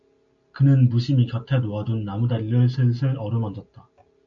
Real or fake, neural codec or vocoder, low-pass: real; none; 7.2 kHz